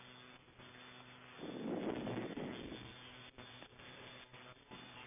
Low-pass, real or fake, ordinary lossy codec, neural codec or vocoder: 3.6 kHz; real; none; none